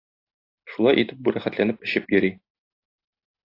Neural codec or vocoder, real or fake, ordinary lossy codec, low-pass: none; real; AAC, 32 kbps; 5.4 kHz